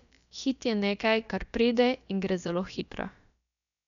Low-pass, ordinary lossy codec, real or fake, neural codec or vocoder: 7.2 kHz; none; fake; codec, 16 kHz, about 1 kbps, DyCAST, with the encoder's durations